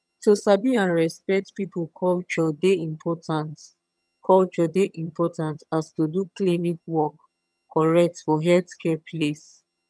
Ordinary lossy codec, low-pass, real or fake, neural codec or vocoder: none; none; fake; vocoder, 22.05 kHz, 80 mel bands, HiFi-GAN